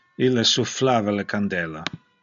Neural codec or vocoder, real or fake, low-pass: none; real; 7.2 kHz